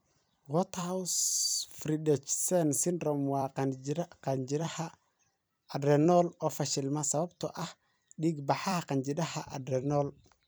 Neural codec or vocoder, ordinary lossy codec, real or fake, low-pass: vocoder, 44.1 kHz, 128 mel bands every 256 samples, BigVGAN v2; none; fake; none